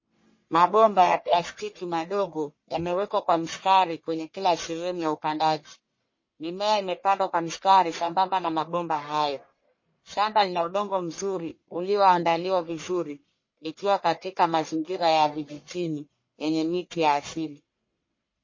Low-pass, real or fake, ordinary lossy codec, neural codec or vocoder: 7.2 kHz; fake; MP3, 32 kbps; codec, 44.1 kHz, 1.7 kbps, Pupu-Codec